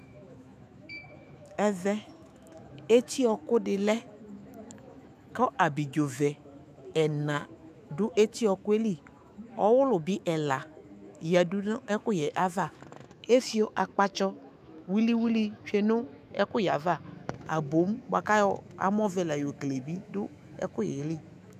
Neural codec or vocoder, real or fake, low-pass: autoencoder, 48 kHz, 128 numbers a frame, DAC-VAE, trained on Japanese speech; fake; 14.4 kHz